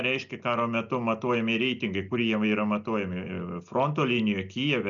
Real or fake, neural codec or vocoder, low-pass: real; none; 7.2 kHz